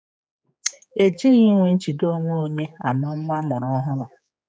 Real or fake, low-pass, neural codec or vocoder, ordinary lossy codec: fake; none; codec, 16 kHz, 4 kbps, X-Codec, HuBERT features, trained on general audio; none